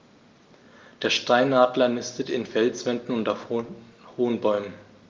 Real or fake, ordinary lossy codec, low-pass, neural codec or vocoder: real; Opus, 32 kbps; 7.2 kHz; none